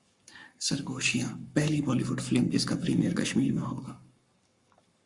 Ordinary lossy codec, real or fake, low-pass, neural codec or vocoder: Opus, 64 kbps; fake; 10.8 kHz; codec, 44.1 kHz, 7.8 kbps, Pupu-Codec